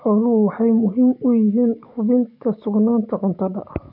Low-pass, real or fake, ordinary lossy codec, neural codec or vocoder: 5.4 kHz; fake; none; codec, 44.1 kHz, 7.8 kbps, DAC